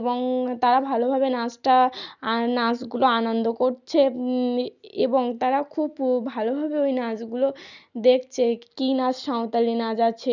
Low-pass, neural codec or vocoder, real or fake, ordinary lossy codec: 7.2 kHz; none; real; none